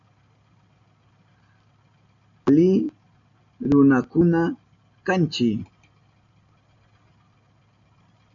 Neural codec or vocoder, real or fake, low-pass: none; real; 7.2 kHz